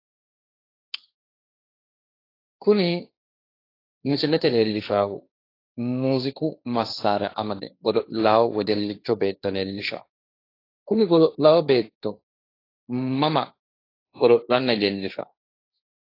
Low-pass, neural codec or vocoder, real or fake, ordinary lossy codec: 5.4 kHz; codec, 16 kHz, 1.1 kbps, Voila-Tokenizer; fake; AAC, 32 kbps